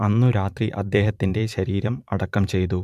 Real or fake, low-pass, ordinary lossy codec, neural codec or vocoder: fake; 14.4 kHz; MP3, 96 kbps; vocoder, 44.1 kHz, 128 mel bands every 512 samples, BigVGAN v2